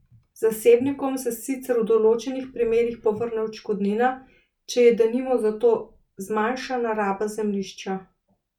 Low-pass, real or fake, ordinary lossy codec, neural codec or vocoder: 19.8 kHz; real; none; none